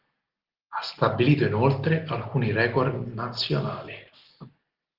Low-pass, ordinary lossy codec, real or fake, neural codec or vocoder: 5.4 kHz; Opus, 16 kbps; real; none